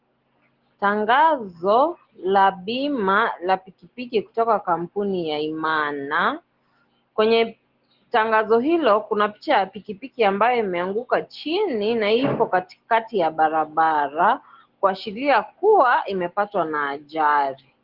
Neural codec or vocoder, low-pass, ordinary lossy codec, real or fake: none; 5.4 kHz; Opus, 16 kbps; real